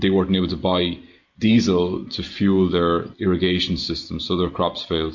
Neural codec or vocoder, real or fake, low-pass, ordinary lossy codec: none; real; 7.2 kHz; MP3, 48 kbps